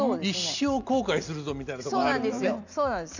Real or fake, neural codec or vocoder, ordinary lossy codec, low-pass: real; none; none; 7.2 kHz